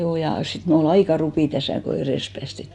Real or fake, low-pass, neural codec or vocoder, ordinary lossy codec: real; 10.8 kHz; none; none